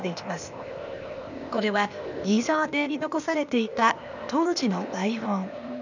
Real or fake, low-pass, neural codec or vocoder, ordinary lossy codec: fake; 7.2 kHz; codec, 16 kHz, 0.8 kbps, ZipCodec; none